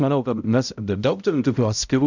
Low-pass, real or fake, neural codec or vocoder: 7.2 kHz; fake; codec, 16 kHz, 0.5 kbps, X-Codec, HuBERT features, trained on balanced general audio